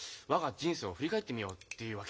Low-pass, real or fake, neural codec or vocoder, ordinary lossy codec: none; real; none; none